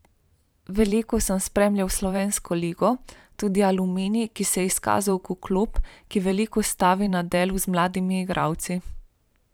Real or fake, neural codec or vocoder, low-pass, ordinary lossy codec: fake; vocoder, 44.1 kHz, 128 mel bands every 512 samples, BigVGAN v2; none; none